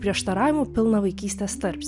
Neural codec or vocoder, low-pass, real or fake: none; 10.8 kHz; real